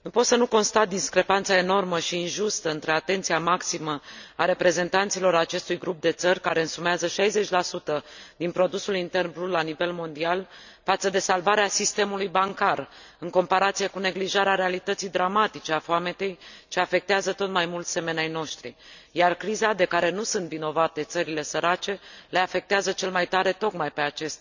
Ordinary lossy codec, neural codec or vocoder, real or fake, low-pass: none; none; real; 7.2 kHz